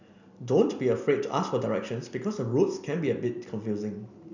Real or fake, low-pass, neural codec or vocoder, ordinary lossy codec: real; 7.2 kHz; none; none